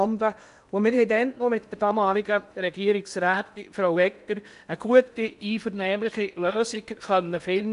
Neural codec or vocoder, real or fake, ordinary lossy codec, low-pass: codec, 16 kHz in and 24 kHz out, 0.8 kbps, FocalCodec, streaming, 65536 codes; fake; none; 10.8 kHz